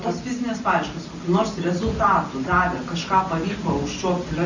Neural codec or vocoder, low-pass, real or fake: none; 7.2 kHz; real